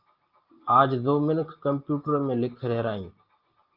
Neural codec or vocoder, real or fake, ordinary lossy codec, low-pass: none; real; Opus, 32 kbps; 5.4 kHz